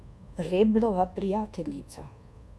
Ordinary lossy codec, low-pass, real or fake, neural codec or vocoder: none; none; fake; codec, 24 kHz, 1.2 kbps, DualCodec